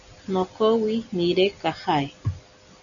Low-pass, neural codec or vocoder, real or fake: 7.2 kHz; none; real